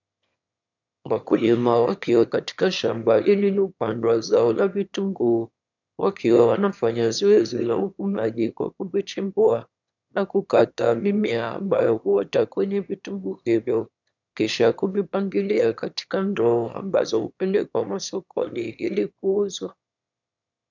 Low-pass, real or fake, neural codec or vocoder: 7.2 kHz; fake; autoencoder, 22.05 kHz, a latent of 192 numbers a frame, VITS, trained on one speaker